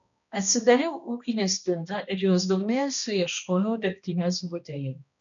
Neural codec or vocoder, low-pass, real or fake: codec, 16 kHz, 1 kbps, X-Codec, HuBERT features, trained on balanced general audio; 7.2 kHz; fake